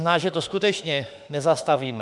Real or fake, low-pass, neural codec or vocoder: fake; 10.8 kHz; autoencoder, 48 kHz, 32 numbers a frame, DAC-VAE, trained on Japanese speech